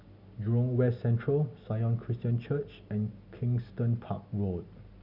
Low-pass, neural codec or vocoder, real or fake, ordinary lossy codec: 5.4 kHz; none; real; none